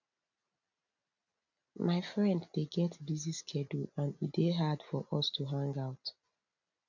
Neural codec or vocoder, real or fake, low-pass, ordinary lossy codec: none; real; 7.2 kHz; none